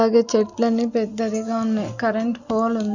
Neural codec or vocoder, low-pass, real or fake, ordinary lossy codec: none; 7.2 kHz; real; none